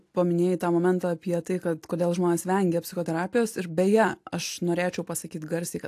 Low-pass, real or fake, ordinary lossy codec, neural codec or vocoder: 14.4 kHz; real; AAC, 64 kbps; none